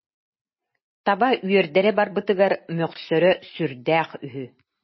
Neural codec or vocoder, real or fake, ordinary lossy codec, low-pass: none; real; MP3, 24 kbps; 7.2 kHz